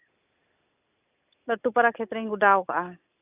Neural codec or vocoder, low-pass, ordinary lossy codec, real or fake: none; 3.6 kHz; none; real